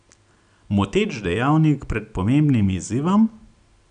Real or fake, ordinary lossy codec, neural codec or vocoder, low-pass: real; none; none; 9.9 kHz